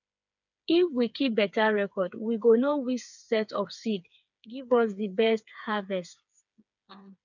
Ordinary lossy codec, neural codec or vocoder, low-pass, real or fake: none; codec, 16 kHz, 4 kbps, FreqCodec, smaller model; 7.2 kHz; fake